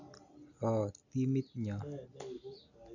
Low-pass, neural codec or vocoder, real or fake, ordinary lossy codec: 7.2 kHz; none; real; none